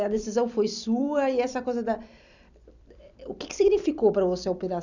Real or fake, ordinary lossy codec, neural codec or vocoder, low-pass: fake; none; vocoder, 44.1 kHz, 128 mel bands every 256 samples, BigVGAN v2; 7.2 kHz